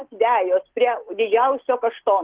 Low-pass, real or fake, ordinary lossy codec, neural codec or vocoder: 3.6 kHz; real; Opus, 16 kbps; none